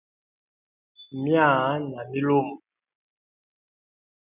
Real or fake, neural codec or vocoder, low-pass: real; none; 3.6 kHz